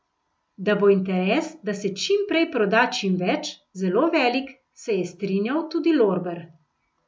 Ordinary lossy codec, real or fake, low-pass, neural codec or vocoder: none; real; none; none